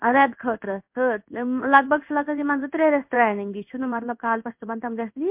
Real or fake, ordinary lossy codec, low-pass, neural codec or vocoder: fake; MP3, 32 kbps; 3.6 kHz; codec, 16 kHz in and 24 kHz out, 1 kbps, XY-Tokenizer